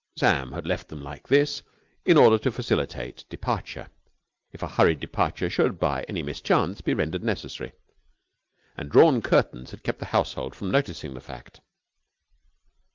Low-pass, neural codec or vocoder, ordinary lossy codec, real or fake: 7.2 kHz; none; Opus, 24 kbps; real